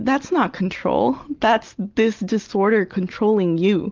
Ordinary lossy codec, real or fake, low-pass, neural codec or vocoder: Opus, 32 kbps; real; 7.2 kHz; none